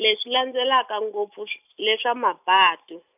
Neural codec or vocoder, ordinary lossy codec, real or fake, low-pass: none; none; real; 3.6 kHz